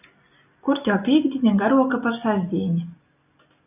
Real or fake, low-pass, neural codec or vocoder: real; 3.6 kHz; none